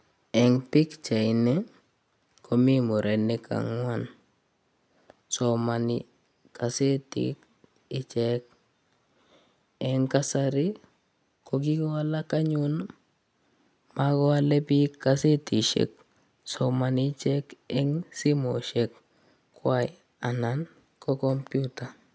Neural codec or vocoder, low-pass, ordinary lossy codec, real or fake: none; none; none; real